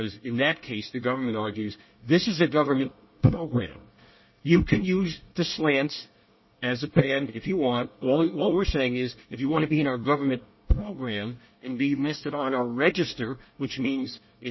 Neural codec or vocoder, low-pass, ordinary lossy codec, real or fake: codec, 24 kHz, 1 kbps, SNAC; 7.2 kHz; MP3, 24 kbps; fake